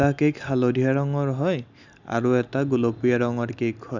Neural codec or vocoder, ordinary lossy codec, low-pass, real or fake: none; none; 7.2 kHz; real